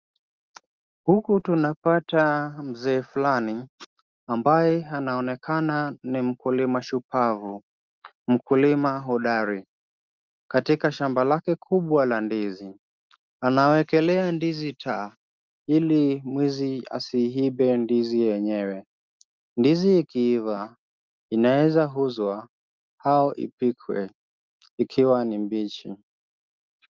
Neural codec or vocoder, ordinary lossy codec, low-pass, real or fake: none; Opus, 32 kbps; 7.2 kHz; real